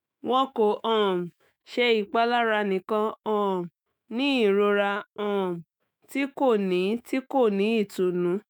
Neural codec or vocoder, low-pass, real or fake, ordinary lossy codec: autoencoder, 48 kHz, 128 numbers a frame, DAC-VAE, trained on Japanese speech; none; fake; none